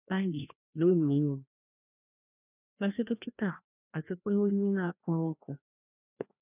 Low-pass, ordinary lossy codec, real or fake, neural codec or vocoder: 3.6 kHz; none; fake; codec, 16 kHz, 1 kbps, FreqCodec, larger model